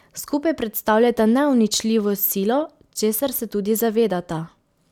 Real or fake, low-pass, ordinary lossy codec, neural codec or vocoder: real; 19.8 kHz; none; none